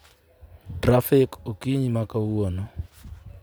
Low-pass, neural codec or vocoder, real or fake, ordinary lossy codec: none; none; real; none